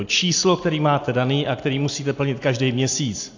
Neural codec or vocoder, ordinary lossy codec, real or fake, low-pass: none; AAC, 48 kbps; real; 7.2 kHz